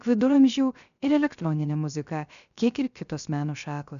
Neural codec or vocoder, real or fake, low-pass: codec, 16 kHz, 0.3 kbps, FocalCodec; fake; 7.2 kHz